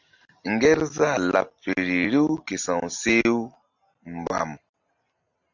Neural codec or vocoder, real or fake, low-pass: none; real; 7.2 kHz